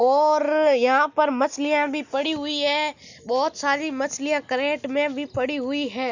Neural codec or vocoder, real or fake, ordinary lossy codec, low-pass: none; real; none; 7.2 kHz